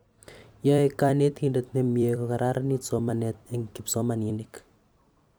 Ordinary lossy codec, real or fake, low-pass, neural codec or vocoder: none; fake; none; vocoder, 44.1 kHz, 128 mel bands every 256 samples, BigVGAN v2